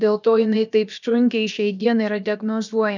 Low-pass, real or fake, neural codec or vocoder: 7.2 kHz; fake; codec, 16 kHz, about 1 kbps, DyCAST, with the encoder's durations